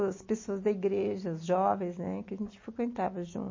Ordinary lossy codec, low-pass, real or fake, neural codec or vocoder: MP3, 32 kbps; 7.2 kHz; real; none